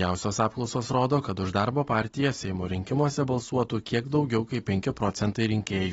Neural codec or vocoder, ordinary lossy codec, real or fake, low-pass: none; AAC, 24 kbps; real; 19.8 kHz